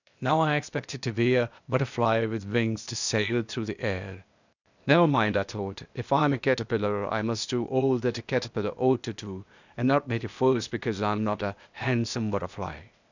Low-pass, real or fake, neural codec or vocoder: 7.2 kHz; fake; codec, 16 kHz, 0.8 kbps, ZipCodec